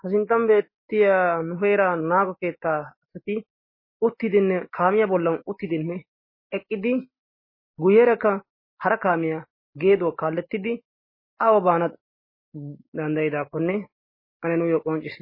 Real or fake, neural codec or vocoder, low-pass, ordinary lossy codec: real; none; 5.4 kHz; MP3, 24 kbps